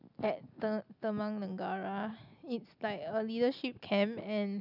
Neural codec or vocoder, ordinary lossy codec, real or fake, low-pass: none; none; real; 5.4 kHz